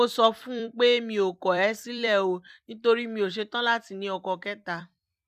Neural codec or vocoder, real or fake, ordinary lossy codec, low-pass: vocoder, 44.1 kHz, 128 mel bands every 256 samples, BigVGAN v2; fake; none; 14.4 kHz